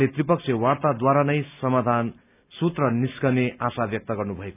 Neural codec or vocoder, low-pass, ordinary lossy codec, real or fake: none; 3.6 kHz; none; real